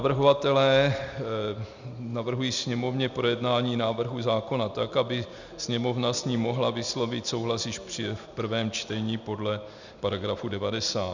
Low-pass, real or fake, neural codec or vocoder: 7.2 kHz; real; none